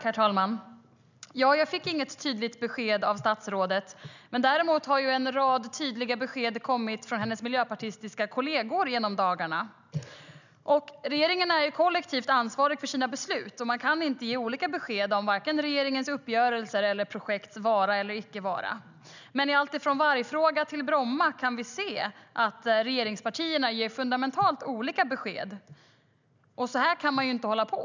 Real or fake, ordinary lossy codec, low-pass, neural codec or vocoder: real; none; 7.2 kHz; none